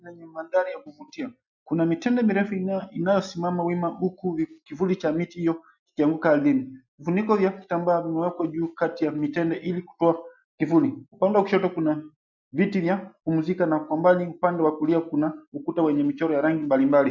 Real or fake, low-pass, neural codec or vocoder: real; 7.2 kHz; none